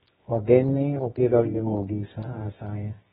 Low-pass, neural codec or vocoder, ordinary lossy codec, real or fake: 10.8 kHz; codec, 24 kHz, 0.9 kbps, WavTokenizer, medium music audio release; AAC, 16 kbps; fake